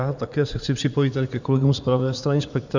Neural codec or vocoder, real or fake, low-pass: vocoder, 44.1 kHz, 80 mel bands, Vocos; fake; 7.2 kHz